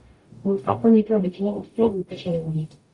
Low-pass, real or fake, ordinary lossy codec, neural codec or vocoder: 10.8 kHz; fake; Opus, 64 kbps; codec, 44.1 kHz, 0.9 kbps, DAC